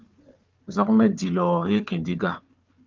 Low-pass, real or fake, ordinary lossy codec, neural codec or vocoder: 7.2 kHz; fake; Opus, 24 kbps; codec, 16 kHz, 4 kbps, FunCodec, trained on Chinese and English, 50 frames a second